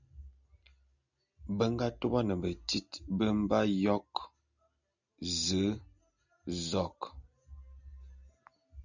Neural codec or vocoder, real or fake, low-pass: none; real; 7.2 kHz